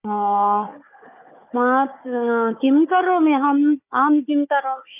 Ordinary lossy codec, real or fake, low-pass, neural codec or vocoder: none; fake; 3.6 kHz; codec, 16 kHz, 4 kbps, FunCodec, trained on Chinese and English, 50 frames a second